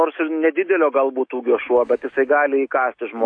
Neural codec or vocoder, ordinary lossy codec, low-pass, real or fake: autoencoder, 48 kHz, 128 numbers a frame, DAC-VAE, trained on Japanese speech; AAC, 48 kbps; 9.9 kHz; fake